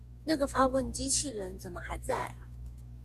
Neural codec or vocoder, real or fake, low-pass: codec, 44.1 kHz, 2.6 kbps, DAC; fake; 14.4 kHz